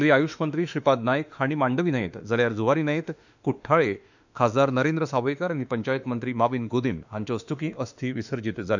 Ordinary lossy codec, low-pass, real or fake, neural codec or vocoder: none; 7.2 kHz; fake; autoencoder, 48 kHz, 32 numbers a frame, DAC-VAE, trained on Japanese speech